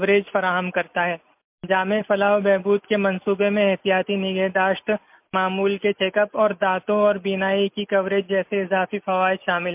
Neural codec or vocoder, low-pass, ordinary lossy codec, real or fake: none; 3.6 kHz; MP3, 32 kbps; real